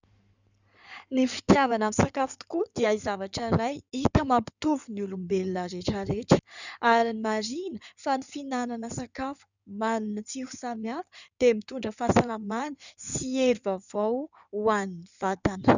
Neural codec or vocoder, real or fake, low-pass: codec, 16 kHz in and 24 kHz out, 2.2 kbps, FireRedTTS-2 codec; fake; 7.2 kHz